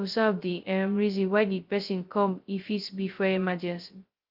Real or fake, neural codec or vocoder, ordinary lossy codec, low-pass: fake; codec, 16 kHz, 0.2 kbps, FocalCodec; Opus, 32 kbps; 5.4 kHz